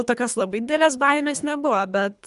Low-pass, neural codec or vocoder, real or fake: 10.8 kHz; codec, 24 kHz, 3 kbps, HILCodec; fake